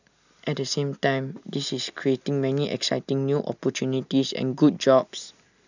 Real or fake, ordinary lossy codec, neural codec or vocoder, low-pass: real; none; none; 7.2 kHz